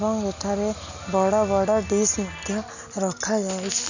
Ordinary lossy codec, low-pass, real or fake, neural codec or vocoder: none; 7.2 kHz; real; none